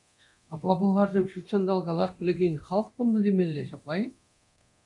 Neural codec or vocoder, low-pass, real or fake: codec, 24 kHz, 0.9 kbps, DualCodec; 10.8 kHz; fake